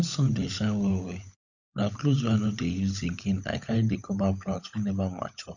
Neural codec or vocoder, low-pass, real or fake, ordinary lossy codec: codec, 16 kHz, 16 kbps, FunCodec, trained on LibriTTS, 50 frames a second; 7.2 kHz; fake; none